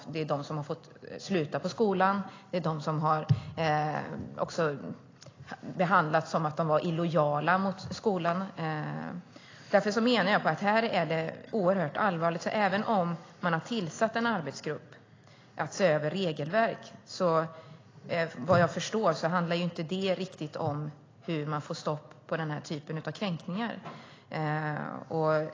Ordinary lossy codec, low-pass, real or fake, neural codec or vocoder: AAC, 32 kbps; 7.2 kHz; real; none